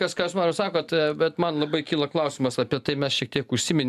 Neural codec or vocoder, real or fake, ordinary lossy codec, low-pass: none; real; AAC, 96 kbps; 14.4 kHz